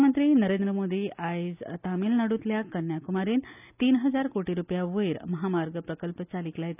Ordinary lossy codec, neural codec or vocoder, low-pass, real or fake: none; none; 3.6 kHz; real